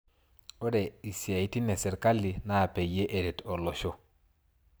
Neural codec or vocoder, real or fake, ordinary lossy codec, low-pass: none; real; none; none